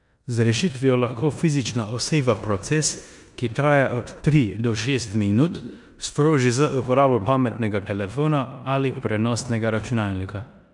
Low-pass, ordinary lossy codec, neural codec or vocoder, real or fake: 10.8 kHz; none; codec, 16 kHz in and 24 kHz out, 0.9 kbps, LongCat-Audio-Codec, four codebook decoder; fake